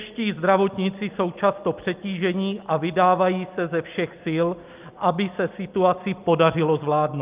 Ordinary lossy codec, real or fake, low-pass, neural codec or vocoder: Opus, 24 kbps; real; 3.6 kHz; none